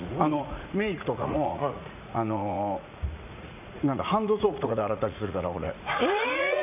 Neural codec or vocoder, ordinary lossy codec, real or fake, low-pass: vocoder, 44.1 kHz, 80 mel bands, Vocos; none; fake; 3.6 kHz